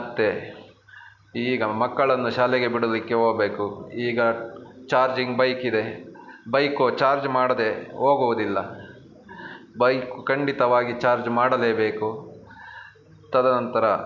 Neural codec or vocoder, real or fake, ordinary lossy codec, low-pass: none; real; none; 7.2 kHz